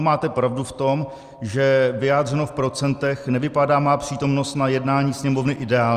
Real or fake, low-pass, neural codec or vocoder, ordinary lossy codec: real; 14.4 kHz; none; Opus, 32 kbps